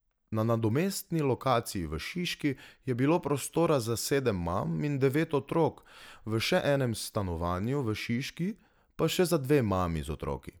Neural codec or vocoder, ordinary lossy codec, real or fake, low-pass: none; none; real; none